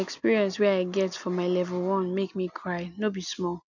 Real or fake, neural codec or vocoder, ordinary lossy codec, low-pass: real; none; none; 7.2 kHz